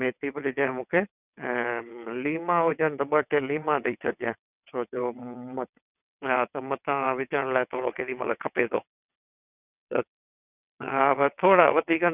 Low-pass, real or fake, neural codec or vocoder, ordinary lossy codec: 3.6 kHz; fake; vocoder, 22.05 kHz, 80 mel bands, WaveNeXt; none